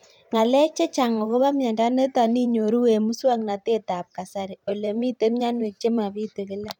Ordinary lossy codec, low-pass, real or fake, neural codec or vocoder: none; 19.8 kHz; fake; vocoder, 44.1 kHz, 128 mel bands every 512 samples, BigVGAN v2